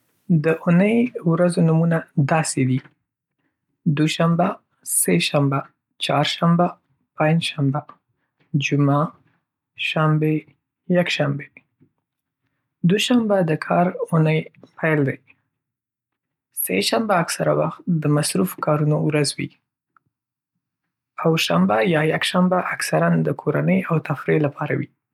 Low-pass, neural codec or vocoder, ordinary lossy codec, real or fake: 19.8 kHz; none; none; real